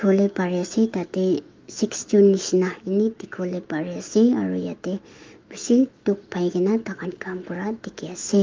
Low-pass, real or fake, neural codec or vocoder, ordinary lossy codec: 7.2 kHz; fake; autoencoder, 48 kHz, 128 numbers a frame, DAC-VAE, trained on Japanese speech; Opus, 32 kbps